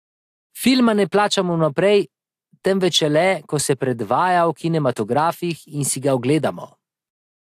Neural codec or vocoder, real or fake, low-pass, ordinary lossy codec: none; real; 14.4 kHz; AAC, 64 kbps